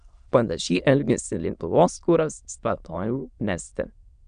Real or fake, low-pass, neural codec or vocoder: fake; 9.9 kHz; autoencoder, 22.05 kHz, a latent of 192 numbers a frame, VITS, trained on many speakers